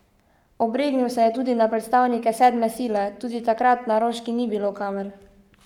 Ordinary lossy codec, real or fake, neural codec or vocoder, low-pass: none; fake; codec, 44.1 kHz, 7.8 kbps, Pupu-Codec; 19.8 kHz